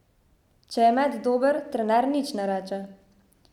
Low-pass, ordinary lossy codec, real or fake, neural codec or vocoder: 19.8 kHz; none; real; none